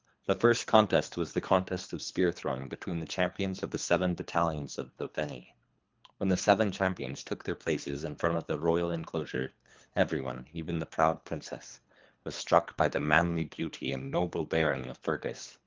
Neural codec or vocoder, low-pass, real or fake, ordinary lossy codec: codec, 24 kHz, 3 kbps, HILCodec; 7.2 kHz; fake; Opus, 32 kbps